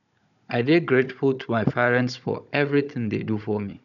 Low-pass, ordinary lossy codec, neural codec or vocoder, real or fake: 7.2 kHz; none; codec, 16 kHz, 16 kbps, FunCodec, trained on Chinese and English, 50 frames a second; fake